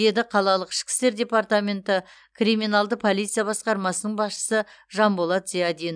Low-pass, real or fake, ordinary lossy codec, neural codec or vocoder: 9.9 kHz; real; none; none